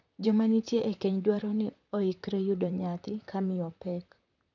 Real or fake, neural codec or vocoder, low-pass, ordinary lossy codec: fake; vocoder, 44.1 kHz, 128 mel bands, Pupu-Vocoder; 7.2 kHz; none